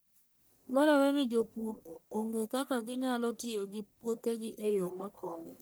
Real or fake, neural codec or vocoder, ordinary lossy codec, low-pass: fake; codec, 44.1 kHz, 1.7 kbps, Pupu-Codec; none; none